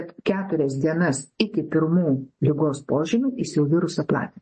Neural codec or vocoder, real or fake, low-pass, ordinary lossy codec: none; real; 10.8 kHz; MP3, 32 kbps